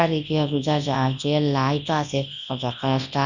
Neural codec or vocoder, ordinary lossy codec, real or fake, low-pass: codec, 24 kHz, 0.9 kbps, WavTokenizer, large speech release; none; fake; 7.2 kHz